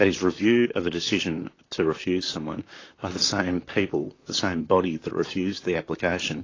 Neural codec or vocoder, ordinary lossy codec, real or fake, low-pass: vocoder, 44.1 kHz, 128 mel bands, Pupu-Vocoder; AAC, 32 kbps; fake; 7.2 kHz